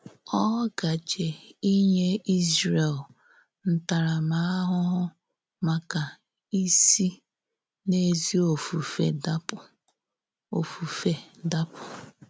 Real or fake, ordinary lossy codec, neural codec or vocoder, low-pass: real; none; none; none